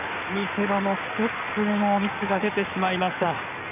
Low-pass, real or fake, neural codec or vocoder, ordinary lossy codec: 3.6 kHz; fake; codec, 16 kHz in and 24 kHz out, 2.2 kbps, FireRedTTS-2 codec; none